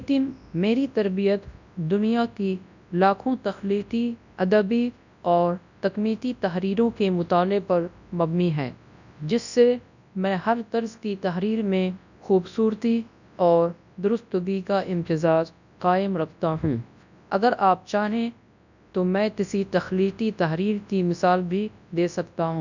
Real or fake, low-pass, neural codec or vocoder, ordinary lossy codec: fake; 7.2 kHz; codec, 24 kHz, 0.9 kbps, WavTokenizer, large speech release; none